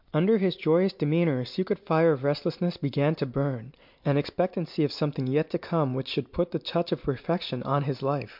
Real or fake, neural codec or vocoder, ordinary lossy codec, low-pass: real; none; MP3, 48 kbps; 5.4 kHz